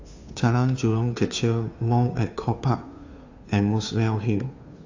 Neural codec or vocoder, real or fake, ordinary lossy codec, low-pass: codec, 16 kHz, 2 kbps, FunCodec, trained on Chinese and English, 25 frames a second; fake; AAC, 48 kbps; 7.2 kHz